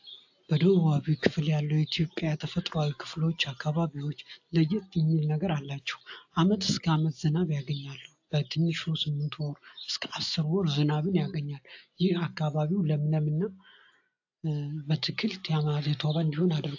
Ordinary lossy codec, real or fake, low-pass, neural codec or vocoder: AAC, 48 kbps; real; 7.2 kHz; none